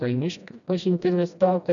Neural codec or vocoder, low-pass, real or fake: codec, 16 kHz, 1 kbps, FreqCodec, smaller model; 7.2 kHz; fake